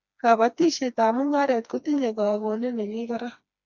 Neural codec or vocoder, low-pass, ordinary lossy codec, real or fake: codec, 16 kHz, 2 kbps, FreqCodec, smaller model; 7.2 kHz; MP3, 64 kbps; fake